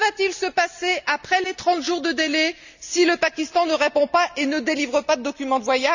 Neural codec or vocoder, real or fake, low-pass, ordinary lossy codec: none; real; 7.2 kHz; none